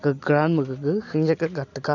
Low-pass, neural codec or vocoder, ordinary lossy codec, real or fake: 7.2 kHz; none; none; real